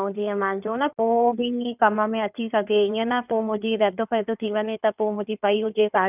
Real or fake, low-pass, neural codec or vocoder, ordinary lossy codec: fake; 3.6 kHz; codec, 16 kHz in and 24 kHz out, 2.2 kbps, FireRedTTS-2 codec; none